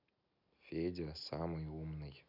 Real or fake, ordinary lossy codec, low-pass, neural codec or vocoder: real; none; 5.4 kHz; none